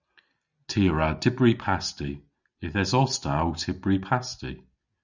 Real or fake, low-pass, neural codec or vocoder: real; 7.2 kHz; none